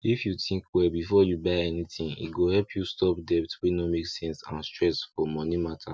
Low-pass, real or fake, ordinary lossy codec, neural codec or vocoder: none; real; none; none